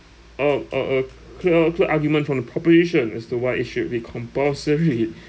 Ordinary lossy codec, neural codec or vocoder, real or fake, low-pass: none; none; real; none